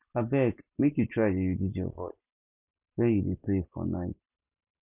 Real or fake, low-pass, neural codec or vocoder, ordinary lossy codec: real; 3.6 kHz; none; none